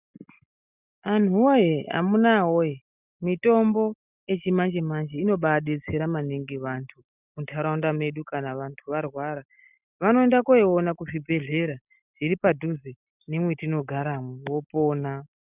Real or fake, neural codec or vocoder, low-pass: real; none; 3.6 kHz